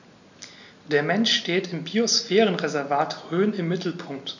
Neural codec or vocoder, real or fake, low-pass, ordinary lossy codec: none; real; 7.2 kHz; none